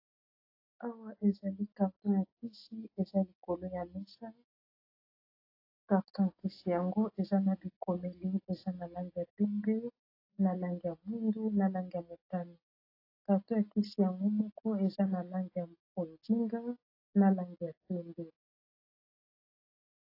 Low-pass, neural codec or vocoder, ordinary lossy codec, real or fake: 5.4 kHz; none; AAC, 32 kbps; real